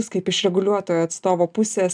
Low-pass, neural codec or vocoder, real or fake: 9.9 kHz; none; real